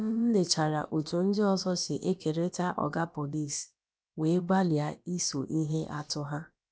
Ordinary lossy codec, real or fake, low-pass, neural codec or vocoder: none; fake; none; codec, 16 kHz, about 1 kbps, DyCAST, with the encoder's durations